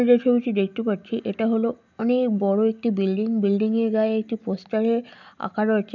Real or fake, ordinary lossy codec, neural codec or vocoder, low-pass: real; none; none; 7.2 kHz